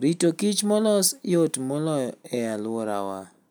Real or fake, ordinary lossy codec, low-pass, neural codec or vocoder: real; none; none; none